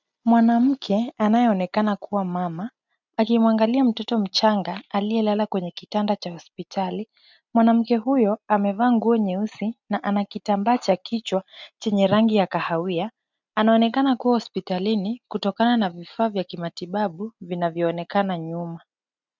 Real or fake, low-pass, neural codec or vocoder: real; 7.2 kHz; none